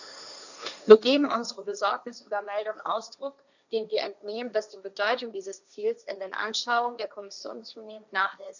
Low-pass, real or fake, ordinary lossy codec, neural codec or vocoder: 7.2 kHz; fake; none; codec, 16 kHz, 1.1 kbps, Voila-Tokenizer